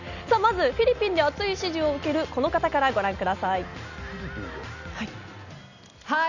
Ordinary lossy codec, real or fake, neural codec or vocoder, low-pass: none; real; none; 7.2 kHz